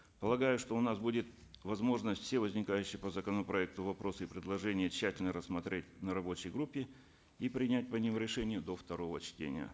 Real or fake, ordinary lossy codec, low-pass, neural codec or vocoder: real; none; none; none